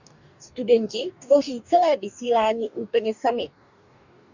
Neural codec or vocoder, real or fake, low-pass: codec, 44.1 kHz, 2.6 kbps, DAC; fake; 7.2 kHz